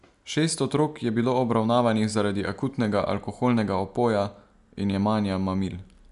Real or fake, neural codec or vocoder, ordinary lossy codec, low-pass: real; none; none; 10.8 kHz